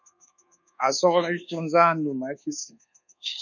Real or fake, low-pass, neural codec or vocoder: fake; 7.2 kHz; codec, 24 kHz, 1.2 kbps, DualCodec